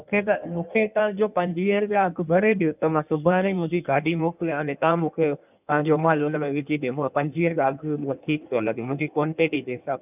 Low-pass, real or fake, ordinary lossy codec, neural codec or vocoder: 3.6 kHz; fake; none; codec, 16 kHz in and 24 kHz out, 1.1 kbps, FireRedTTS-2 codec